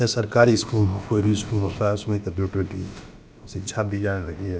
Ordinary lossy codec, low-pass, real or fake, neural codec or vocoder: none; none; fake; codec, 16 kHz, about 1 kbps, DyCAST, with the encoder's durations